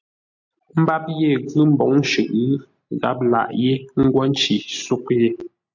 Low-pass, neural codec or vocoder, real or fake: 7.2 kHz; none; real